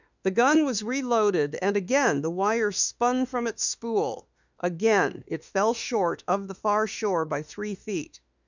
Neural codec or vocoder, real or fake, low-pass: autoencoder, 48 kHz, 32 numbers a frame, DAC-VAE, trained on Japanese speech; fake; 7.2 kHz